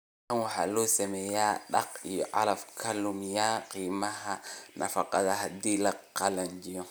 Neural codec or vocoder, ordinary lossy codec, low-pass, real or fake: none; none; none; real